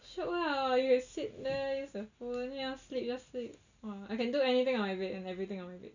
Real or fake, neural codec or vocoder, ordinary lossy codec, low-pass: real; none; none; 7.2 kHz